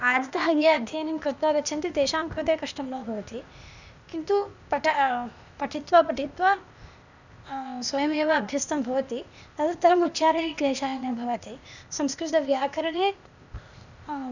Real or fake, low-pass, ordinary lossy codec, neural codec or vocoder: fake; 7.2 kHz; none; codec, 16 kHz, 0.8 kbps, ZipCodec